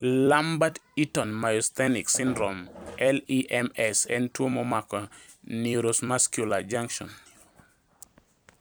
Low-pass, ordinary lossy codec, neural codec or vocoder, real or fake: none; none; vocoder, 44.1 kHz, 128 mel bands every 256 samples, BigVGAN v2; fake